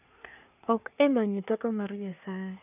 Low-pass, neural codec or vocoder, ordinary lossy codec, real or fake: 3.6 kHz; codec, 24 kHz, 1 kbps, SNAC; none; fake